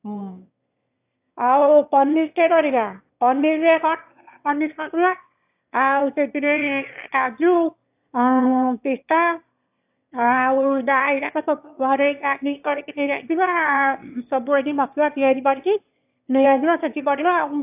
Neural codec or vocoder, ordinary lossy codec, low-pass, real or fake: autoencoder, 22.05 kHz, a latent of 192 numbers a frame, VITS, trained on one speaker; AAC, 32 kbps; 3.6 kHz; fake